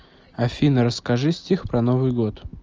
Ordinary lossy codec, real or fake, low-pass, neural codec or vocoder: Opus, 24 kbps; real; 7.2 kHz; none